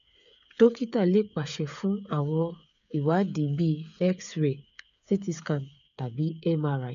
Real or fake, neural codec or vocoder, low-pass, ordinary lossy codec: fake; codec, 16 kHz, 8 kbps, FreqCodec, smaller model; 7.2 kHz; none